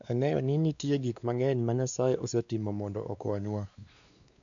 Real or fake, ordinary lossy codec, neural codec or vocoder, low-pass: fake; none; codec, 16 kHz, 2 kbps, X-Codec, WavLM features, trained on Multilingual LibriSpeech; 7.2 kHz